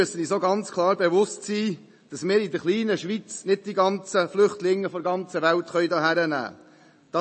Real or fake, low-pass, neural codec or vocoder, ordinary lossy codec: real; 9.9 kHz; none; MP3, 32 kbps